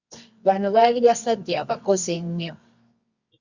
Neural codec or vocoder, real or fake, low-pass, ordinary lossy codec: codec, 24 kHz, 0.9 kbps, WavTokenizer, medium music audio release; fake; 7.2 kHz; Opus, 64 kbps